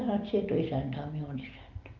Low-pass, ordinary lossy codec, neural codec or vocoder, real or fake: 7.2 kHz; Opus, 24 kbps; none; real